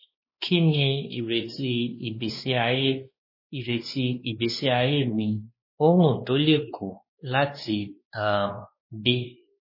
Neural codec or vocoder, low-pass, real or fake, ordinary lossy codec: codec, 16 kHz, 2 kbps, X-Codec, WavLM features, trained on Multilingual LibriSpeech; 5.4 kHz; fake; MP3, 24 kbps